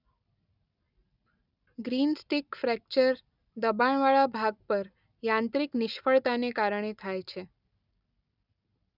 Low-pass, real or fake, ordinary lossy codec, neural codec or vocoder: 5.4 kHz; real; none; none